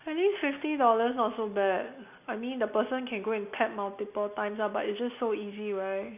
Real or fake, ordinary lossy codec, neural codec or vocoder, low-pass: real; none; none; 3.6 kHz